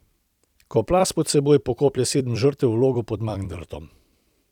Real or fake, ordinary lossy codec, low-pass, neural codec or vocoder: fake; none; 19.8 kHz; vocoder, 44.1 kHz, 128 mel bands every 256 samples, BigVGAN v2